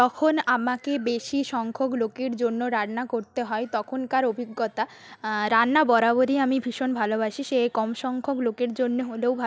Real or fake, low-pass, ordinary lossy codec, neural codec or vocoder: real; none; none; none